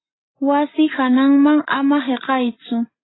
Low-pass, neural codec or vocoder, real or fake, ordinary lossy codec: 7.2 kHz; none; real; AAC, 16 kbps